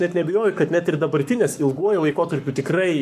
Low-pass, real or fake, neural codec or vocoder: 14.4 kHz; fake; codec, 44.1 kHz, 7.8 kbps, Pupu-Codec